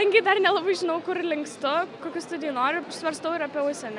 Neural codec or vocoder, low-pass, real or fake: none; 10.8 kHz; real